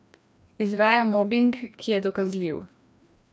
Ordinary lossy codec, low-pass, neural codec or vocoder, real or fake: none; none; codec, 16 kHz, 1 kbps, FreqCodec, larger model; fake